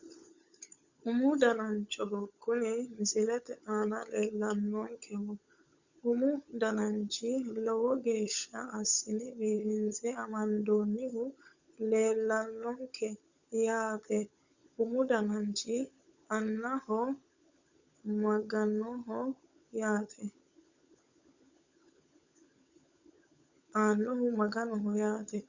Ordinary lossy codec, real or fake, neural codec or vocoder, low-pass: Opus, 64 kbps; fake; codec, 16 kHz, 16 kbps, FunCodec, trained on LibriTTS, 50 frames a second; 7.2 kHz